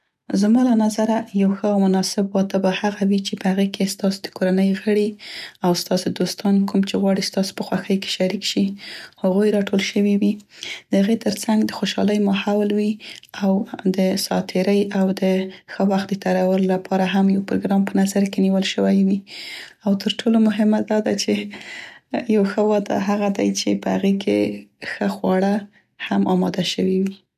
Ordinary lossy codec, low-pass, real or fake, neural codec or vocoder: none; 14.4 kHz; real; none